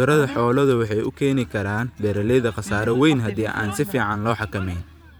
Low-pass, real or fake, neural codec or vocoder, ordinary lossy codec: none; fake; vocoder, 44.1 kHz, 128 mel bands every 512 samples, BigVGAN v2; none